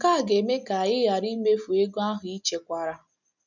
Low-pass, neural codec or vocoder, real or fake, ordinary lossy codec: 7.2 kHz; none; real; none